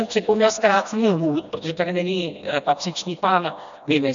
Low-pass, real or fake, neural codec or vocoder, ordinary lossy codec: 7.2 kHz; fake; codec, 16 kHz, 1 kbps, FreqCodec, smaller model; MP3, 96 kbps